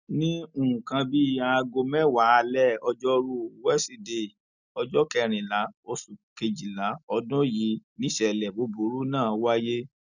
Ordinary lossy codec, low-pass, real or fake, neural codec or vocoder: none; 7.2 kHz; real; none